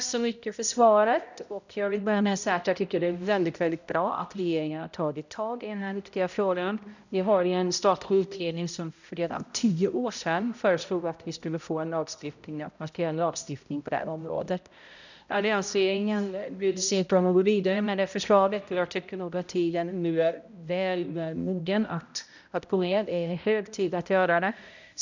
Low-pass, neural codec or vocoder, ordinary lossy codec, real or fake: 7.2 kHz; codec, 16 kHz, 0.5 kbps, X-Codec, HuBERT features, trained on balanced general audio; none; fake